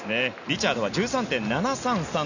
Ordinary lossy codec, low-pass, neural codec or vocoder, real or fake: none; 7.2 kHz; none; real